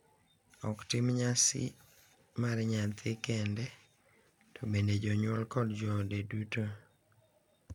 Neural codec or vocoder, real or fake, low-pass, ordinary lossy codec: none; real; 19.8 kHz; none